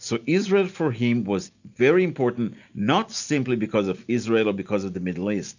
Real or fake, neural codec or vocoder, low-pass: real; none; 7.2 kHz